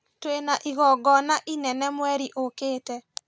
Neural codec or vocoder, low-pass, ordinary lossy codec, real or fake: none; none; none; real